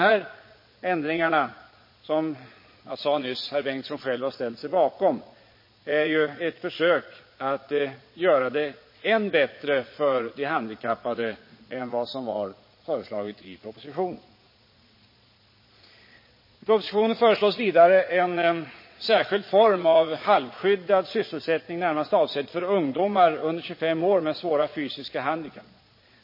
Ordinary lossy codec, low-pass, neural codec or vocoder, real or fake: MP3, 24 kbps; 5.4 kHz; vocoder, 22.05 kHz, 80 mel bands, Vocos; fake